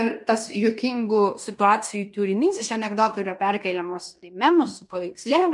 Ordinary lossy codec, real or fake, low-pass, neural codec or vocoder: MP3, 96 kbps; fake; 10.8 kHz; codec, 16 kHz in and 24 kHz out, 0.9 kbps, LongCat-Audio-Codec, fine tuned four codebook decoder